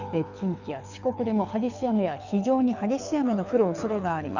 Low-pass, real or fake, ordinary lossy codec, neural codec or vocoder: 7.2 kHz; fake; none; codec, 24 kHz, 6 kbps, HILCodec